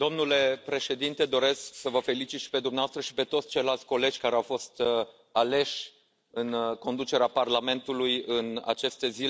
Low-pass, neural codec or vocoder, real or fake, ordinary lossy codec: none; none; real; none